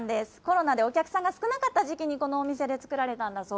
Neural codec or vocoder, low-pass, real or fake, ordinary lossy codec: none; none; real; none